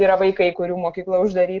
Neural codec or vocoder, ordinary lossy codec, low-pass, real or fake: none; Opus, 16 kbps; 7.2 kHz; real